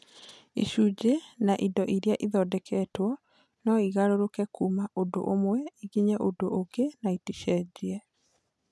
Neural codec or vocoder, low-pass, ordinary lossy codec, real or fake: none; none; none; real